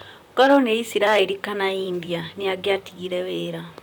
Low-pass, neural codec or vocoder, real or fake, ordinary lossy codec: none; vocoder, 44.1 kHz, 128 mel bands, Pupu-Vocoder; fake; none